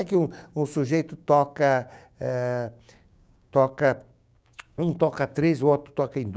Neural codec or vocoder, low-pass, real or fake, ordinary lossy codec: codec, 16 kHz, 6 kbps, DAC; none; fake; none